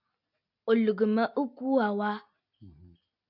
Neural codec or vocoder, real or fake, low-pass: none; real; 5.4 kHz